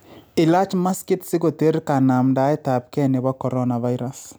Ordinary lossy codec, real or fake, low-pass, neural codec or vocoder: none; real; none; none